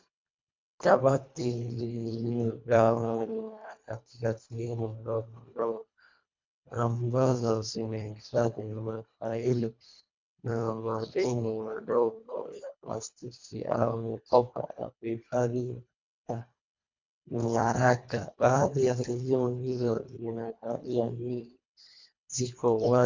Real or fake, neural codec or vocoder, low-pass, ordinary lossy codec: fake; codec, 24 kHz, 1.5 kbps, HILCodec; 7.2 kHz; MP3, 64 kbps